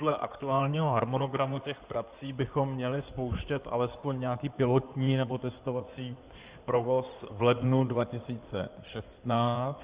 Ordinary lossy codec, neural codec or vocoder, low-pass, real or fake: Opus, 64 kbps; codec, 16 kHz in and 24 kHz out, 2.2 kbps, FireRedTTS-2 codec; 3.6 kHz; fake